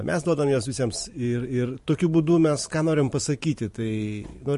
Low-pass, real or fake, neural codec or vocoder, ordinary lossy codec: 14.4 kHz; fake; vocoder, 44.1 kHz, 128 mel bands every 512 samples, BigVGAN v2; MP3, 48 kbps